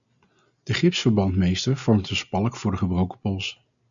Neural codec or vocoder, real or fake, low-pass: none; real; 7.2 kHz